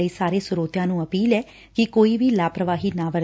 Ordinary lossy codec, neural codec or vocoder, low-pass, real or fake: none; none; none; real